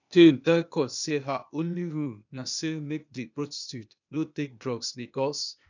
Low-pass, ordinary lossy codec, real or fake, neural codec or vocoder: 7.2 kHz; none; fake; codec, 16 kHz, 0.8 kbps, ZipCodec